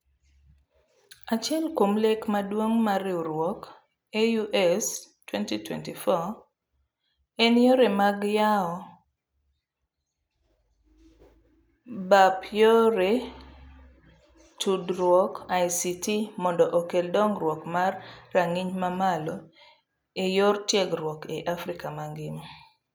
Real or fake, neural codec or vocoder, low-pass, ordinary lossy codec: real; none; none; none